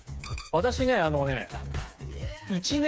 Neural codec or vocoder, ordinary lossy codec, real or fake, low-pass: codec, 16 kHz, 4 kbps, FreqCodec, smaller model; none; fake; none